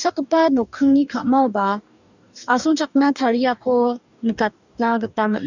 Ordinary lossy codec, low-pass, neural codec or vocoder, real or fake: none; 7.2 kHz; codec, 44.1 kHz, 2.6 kbps, DAC; fake